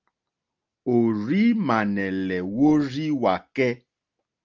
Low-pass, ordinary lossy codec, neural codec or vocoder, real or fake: 7.2 kHz; Opus, 24 kbps; none; real